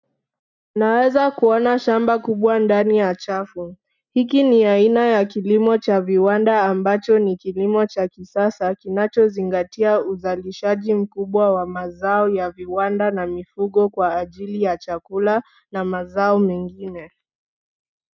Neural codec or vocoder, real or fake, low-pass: none; real; 7.2 kHz